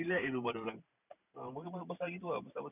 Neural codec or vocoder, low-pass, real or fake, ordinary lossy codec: vocoder, 44.1 kHz, 128 mel bands every 512 samples, BigVGAN v2; 3.6 kHz; fake; none